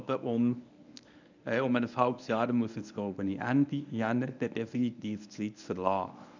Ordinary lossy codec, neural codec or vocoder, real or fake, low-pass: none; codec, 24 kHz, 0.9 kbps, WavTokenizer, medium speech release version 1; fake; 7.2 kHz